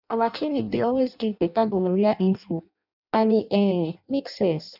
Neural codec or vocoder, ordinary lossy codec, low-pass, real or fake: codec, 16 kHz in and 24 kHz out, 0.6 kbps, FireRedTTS-2 codec; none; 5.4 kHz; fake